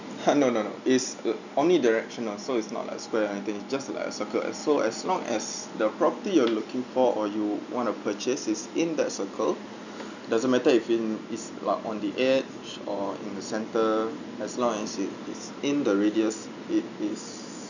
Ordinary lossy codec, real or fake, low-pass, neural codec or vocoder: none; real; 7.2 kHz; none